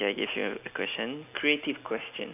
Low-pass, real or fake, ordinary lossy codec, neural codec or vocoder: 3.6 kHz; real; none; none